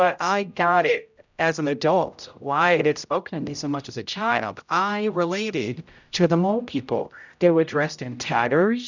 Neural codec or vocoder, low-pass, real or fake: codec, 16 kHz, 0.5 kbps, X-Codec, HuBERT features, trained on general audio; 7.2 kHz; fake